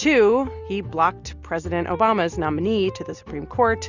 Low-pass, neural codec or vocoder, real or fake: 7.2 kHz; none; real